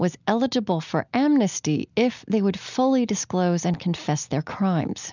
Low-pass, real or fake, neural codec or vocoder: 7.2 kHz; real; none